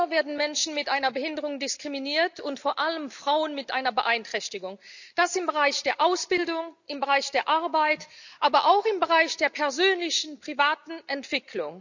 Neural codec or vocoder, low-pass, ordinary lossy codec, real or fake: none; 7.2 kHz; none; real